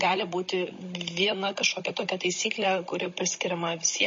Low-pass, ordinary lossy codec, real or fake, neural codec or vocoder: 7.2 kHz; MP3, 32 kbps; fake; codec, 16 kHz, 16 kbps, FunCodec, trained on Chinese and English, 50 frames a second